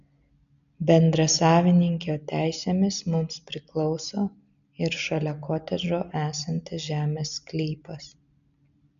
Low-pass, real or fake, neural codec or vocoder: 7.2 kHz; real; none